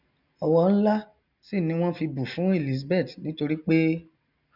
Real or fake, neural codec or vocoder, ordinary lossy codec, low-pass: real; none; none; 5.4 kHz